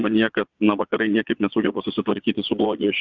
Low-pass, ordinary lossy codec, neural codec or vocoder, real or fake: 7.2 kHz; Opus, 64 kbps; vocoder, 22.05 kHz, 80 mel bands, Vocos; fake